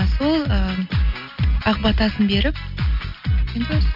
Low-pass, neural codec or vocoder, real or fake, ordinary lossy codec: 5.4 kHz; none; real; none